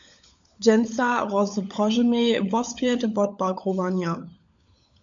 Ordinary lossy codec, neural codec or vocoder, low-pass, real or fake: AAC, 64 kbps; codec, 16 kHz, 16 kbps, FunCodec, trained on LibriTTS, 50 frames a second; 7.2 kHz; fake